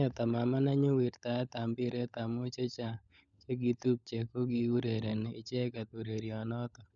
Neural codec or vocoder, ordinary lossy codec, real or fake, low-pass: codec, 16 kHz, 16 kbps, FunCodec, trained on LibriTTS, 50 frames a second; none; fake; 7.2 kHz